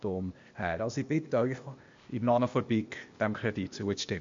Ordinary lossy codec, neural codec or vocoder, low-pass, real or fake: MP3, 48 kbps; codec, 16 kHz, 0.8 kbps, ZipCodec; 7.2 kHz; fake